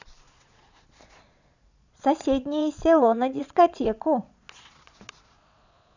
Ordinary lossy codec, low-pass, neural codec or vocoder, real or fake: none; 7.2 kHz; vocoder, 22.05 kHz, 80 mel bands, Vocos; fake